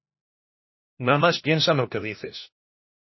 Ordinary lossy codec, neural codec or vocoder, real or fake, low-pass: MP3, 24 kbps; codec, 16 kHz, 1 kbps, FunCodec, trained on LibriTTS, 50 frames a second; fake; 7.2 kHz